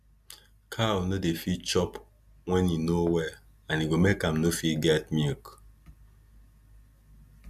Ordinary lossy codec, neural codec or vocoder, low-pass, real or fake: none; none; 14.4 kHz; real